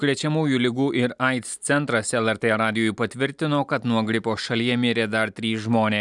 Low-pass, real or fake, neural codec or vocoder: 10.8 kHz; real; none